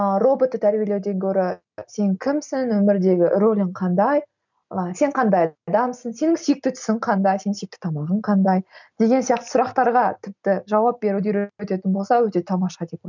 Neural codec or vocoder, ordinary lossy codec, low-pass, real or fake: none; none; 7.2 kHz; real